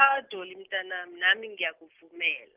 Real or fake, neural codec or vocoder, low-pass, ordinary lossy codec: real; none; 3.6 kHz; Opus, 24 kbps